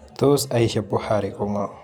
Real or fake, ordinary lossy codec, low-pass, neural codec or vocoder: fake; none; 19.8 kHz; vocoder, 44.1 kHz, 128 mel bands every 512 samples, BigVGAN v2